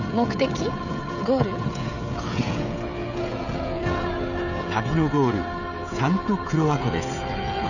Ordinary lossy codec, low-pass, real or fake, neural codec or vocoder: none; 7.2 kHz; fake; codec, 16 kHz, 16 kbps, FreqCodec, smaller model